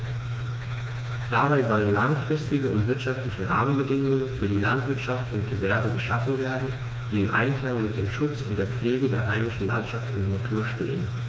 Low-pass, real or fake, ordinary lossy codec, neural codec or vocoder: none; fake; none; codec, 16 kHz, 2 kbps, FreqCodec, smaller model